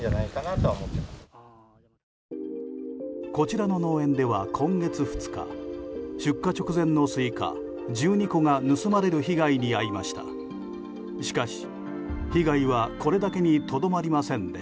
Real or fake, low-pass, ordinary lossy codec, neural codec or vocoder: real; none; none; none